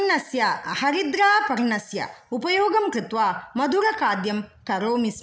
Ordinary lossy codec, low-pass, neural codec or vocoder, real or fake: none; none; none; real